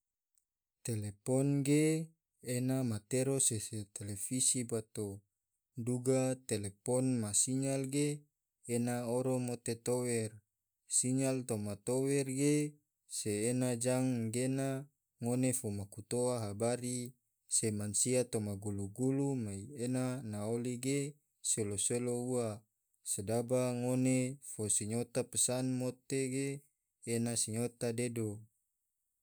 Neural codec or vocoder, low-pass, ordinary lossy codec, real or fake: none; none; none; real